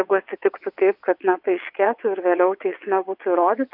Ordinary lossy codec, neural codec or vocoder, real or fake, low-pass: AAC, 48 kbps; vocoder, 22.05 kHz, 80 mel bands, WaveNeXt; fake; 5.4 kHz